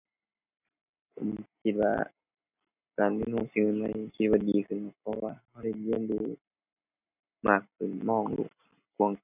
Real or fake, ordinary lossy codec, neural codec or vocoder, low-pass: real; none; none; 3.6 kHz